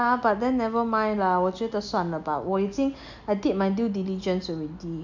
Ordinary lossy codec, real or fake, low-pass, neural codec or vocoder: none; real; 7.2 kHz; none